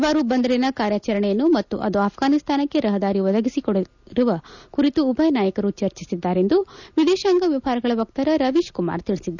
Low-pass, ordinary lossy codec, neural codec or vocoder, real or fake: 7.2 kHz; none; none; real